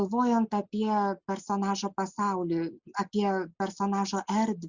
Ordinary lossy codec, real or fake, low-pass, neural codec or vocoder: Opus, 64 kbps; real; 7.2 kHz; none